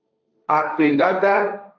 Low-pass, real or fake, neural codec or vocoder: 7.2 kHz; fake; codec, 16 kHz, 1.1 kbps, Voila-Tokenizer